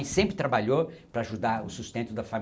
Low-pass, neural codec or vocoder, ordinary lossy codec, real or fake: none; none; none; real